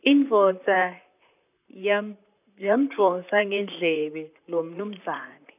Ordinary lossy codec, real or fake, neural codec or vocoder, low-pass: none; fake; vocoder, 44.1 kHz, 128 mel bands, Pupu-Vocoder; 3.6 kHz